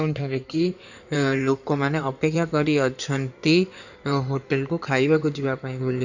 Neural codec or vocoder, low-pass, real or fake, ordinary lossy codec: codec, 16 kHz in and 24 kHz out, 2.2 kbps, FireRedTTS-2 codec; 7.2 kHz; fake; none